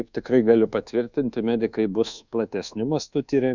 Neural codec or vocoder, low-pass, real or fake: codec, 24 kHz, 1.2 kbps, DualCodec; 7.2 kHz; fake